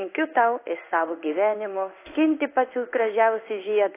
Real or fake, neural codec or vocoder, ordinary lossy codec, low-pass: fake; codec, 16 kHz in and 24 kHz out, 1 kbps, XY-Tokenizer; AAC, 24 kbps; 3.6 kHz